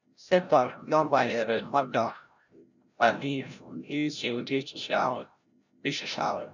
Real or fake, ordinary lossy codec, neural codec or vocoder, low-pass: fake; none; codec, 16 kHz, 0.5 kbps, FreqCodec, larger model; 7.2 kHz